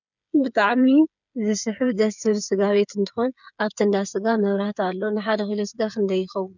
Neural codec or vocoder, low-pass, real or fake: codec, 16 kHz, 8 kbps, FreqCodec, smaller model; 7.2 kHz; fake